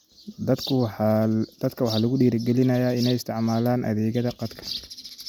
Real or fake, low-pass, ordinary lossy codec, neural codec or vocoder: real; none; none; none